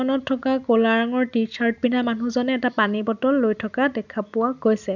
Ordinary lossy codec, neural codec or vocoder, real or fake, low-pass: none; none; real; 7.2 kHz